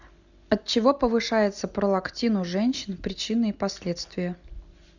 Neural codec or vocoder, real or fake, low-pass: none; real; 7.2 kHz